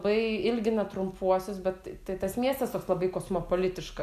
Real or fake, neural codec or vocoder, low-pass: real; none; 14.4 kHz